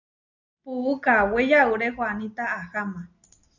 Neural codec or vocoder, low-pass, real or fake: none; 7.2 kHz; real